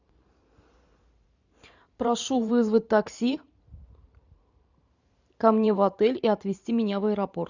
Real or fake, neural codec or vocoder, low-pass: fake; vocoder, 44.1 kHz, 128 mel bands every 512 samples, BigVGAN v2; 7.2 kHz